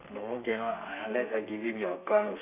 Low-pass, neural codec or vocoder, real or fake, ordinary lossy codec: 3.6 kHz; codec, 32 kHz, 1.9 kbps, SNAC; fake; none